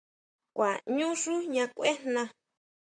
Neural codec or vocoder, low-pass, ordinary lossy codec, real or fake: vocoder, 44.1 kHz, 128 mel bands, Pupu-Vocoder; 9.9 kHz; MP3, 64 kbps; fake